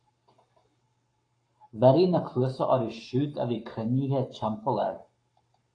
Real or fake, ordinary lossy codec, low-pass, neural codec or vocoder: fake; AAC, 48 kbps; 9.9 kHz; codec, 44.1 kHz, 7.8 kbps, Pupu-Codec